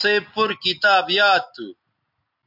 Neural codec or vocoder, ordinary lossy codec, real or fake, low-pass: none; MP3, 48 kbps; real; 5.4 kHz